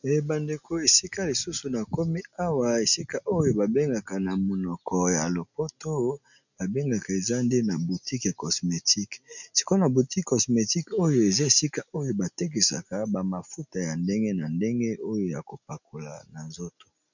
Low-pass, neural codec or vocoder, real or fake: 7.2 kHz; none; real